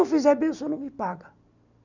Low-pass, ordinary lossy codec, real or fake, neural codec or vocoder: 7.2 kHz; none; real; none